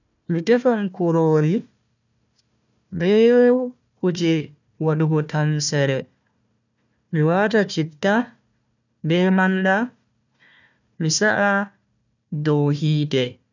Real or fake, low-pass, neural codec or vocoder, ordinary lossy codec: fake; 7.2 kHz; codec, 16 kHz, 1 kbps, FunCodec, trained on Chinese and English, 50 frames a second; none